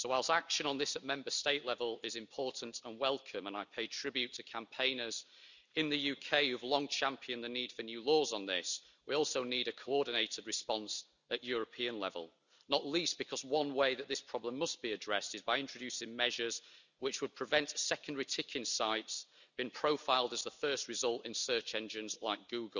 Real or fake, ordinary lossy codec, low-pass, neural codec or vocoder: real; none; 7.2 kHz; none